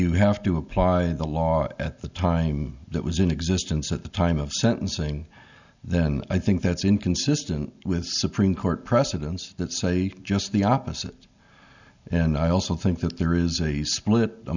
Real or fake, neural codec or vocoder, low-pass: real; none; 7.2 kHz